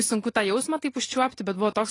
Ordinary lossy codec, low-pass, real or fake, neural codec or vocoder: AAC, 32 kbps; 10.8 kHz; real; none